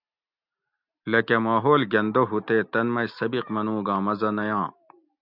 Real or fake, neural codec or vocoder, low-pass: real; none; 5.4 kHz